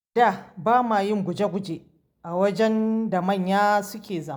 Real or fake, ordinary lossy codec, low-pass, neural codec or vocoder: real; none; none; none